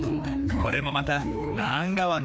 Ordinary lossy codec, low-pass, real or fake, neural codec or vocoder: none; none; fake; codec, 16 kHz, 2 kbps, FreqCodec, larger model